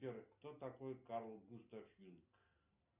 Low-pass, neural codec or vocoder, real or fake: 3.6 kHz; none; real